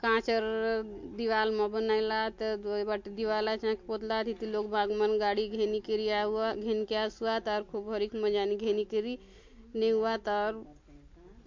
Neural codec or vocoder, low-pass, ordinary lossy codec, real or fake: none; 7.2 kHz; MP3, 48 kbps; real